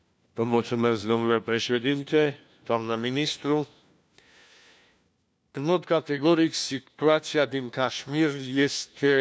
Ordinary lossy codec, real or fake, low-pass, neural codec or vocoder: none; fake; none; codec, 16 kHz, 1 kbps, FunCodec, trained on LibriTTS, 50 frames a second